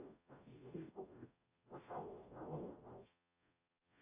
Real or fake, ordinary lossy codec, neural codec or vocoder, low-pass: fake; AAC, 32 kbps; codec, 44.1 kHz, 0.9 kbps, DAC; 3.6 kHz